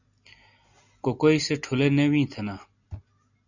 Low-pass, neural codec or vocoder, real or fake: 7.2 kHz; none; real